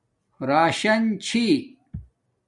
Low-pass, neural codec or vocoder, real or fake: 10.8 kHz; none; real